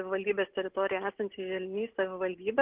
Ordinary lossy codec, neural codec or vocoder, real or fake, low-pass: Opus, 16 kbps; none; real; 3.6 kHz